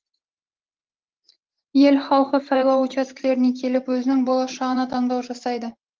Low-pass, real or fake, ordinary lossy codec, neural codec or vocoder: 7.2 kHz; fake; Opus, 16 kbps; vocoder, 44.1 kHz, 128 mel bands every 512 samples, BigVGAN v2